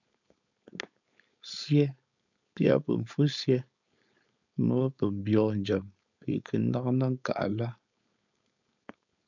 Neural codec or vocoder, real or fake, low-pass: codec, 16 kHz, 4.8 kbps, FACodec; fake; 7.2 kHz